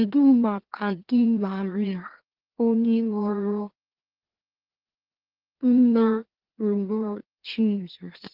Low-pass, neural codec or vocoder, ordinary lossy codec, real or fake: 5.4 kHz; autoencoder, 44.1 kHz, a latent of 192 numbers a frame, MeloTTS; Opus, 16 kbps; fake